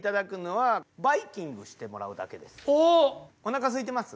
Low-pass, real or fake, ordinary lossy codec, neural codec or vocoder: none; real; none; none